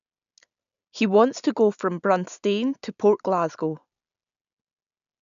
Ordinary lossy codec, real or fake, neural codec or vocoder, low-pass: none; real; none; 7.2 kHz